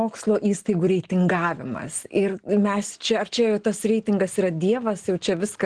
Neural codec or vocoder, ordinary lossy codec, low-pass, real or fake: none; Opus, 16 kbps; 9.9 kHz; real